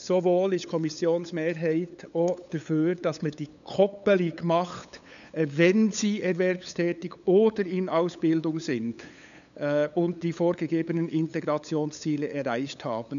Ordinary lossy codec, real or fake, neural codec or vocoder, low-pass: none; fake; codec, 16 kHz, 8 kbps, FunCodec, trained on LibriTTS, 25 frames a second; 7.2 kHz